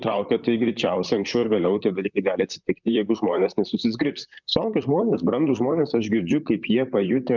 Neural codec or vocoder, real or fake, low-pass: vocoder, 44.1 kHz, 128 mel bands, Pupu-Vocoder; fake; 7.2 kHz